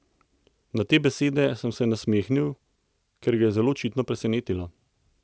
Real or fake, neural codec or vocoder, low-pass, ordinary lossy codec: real; none; none; none